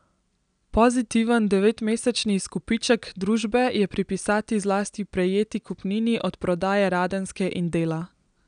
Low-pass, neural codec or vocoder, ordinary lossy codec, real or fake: 9.9 kHz; none; none; real